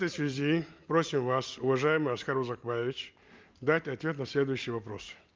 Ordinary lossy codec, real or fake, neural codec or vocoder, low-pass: Opus, 24 kbps; real; none; 7.2 kHz